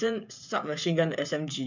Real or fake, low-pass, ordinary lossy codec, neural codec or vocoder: fake; 7.2 kHz; MP3, 64 kbps; codec, 16 kHz, 16 kbps, FreqCodec, smaller model